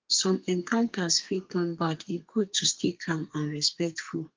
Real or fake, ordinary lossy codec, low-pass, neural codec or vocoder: fake; Opus, 32 kbps; 7.2 kHz; codec, 32 kHz, 1.9 kbps, SNAC